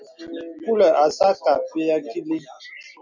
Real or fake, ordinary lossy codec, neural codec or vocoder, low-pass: real; MP3, 64 kbps; none; 7.2 kHz